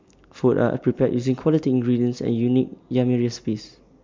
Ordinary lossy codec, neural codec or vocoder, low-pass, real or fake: MP3, 64 kbps; none; 7.2 kHz; real